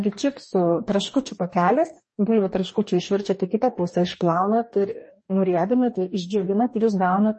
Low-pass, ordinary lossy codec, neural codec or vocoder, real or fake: 10.8 kHz; MP3, 32 kbps; codec, 44.1 kHz, 2.6 kbps, DAC; fake